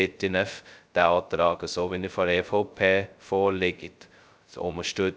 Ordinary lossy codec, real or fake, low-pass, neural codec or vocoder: none; fake; none; codec, 16 kHz, 0.2 kbps, FocalCodec